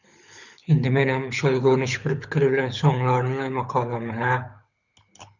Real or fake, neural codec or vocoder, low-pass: fake; codec, 24 kHz, 6 kbps, HILCodec; 7.2 kHz